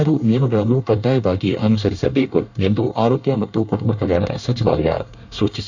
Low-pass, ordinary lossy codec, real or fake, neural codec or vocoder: 7.2 kHz; none; fake; codec, 24 kHz, 1 kbps, SNAC